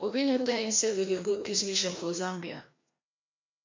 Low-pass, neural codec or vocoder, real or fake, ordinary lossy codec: 7.2 kHz; codec, 16 kHz, 1 kbps, FreqCodec, larger model; fake; MP3, 48 kbps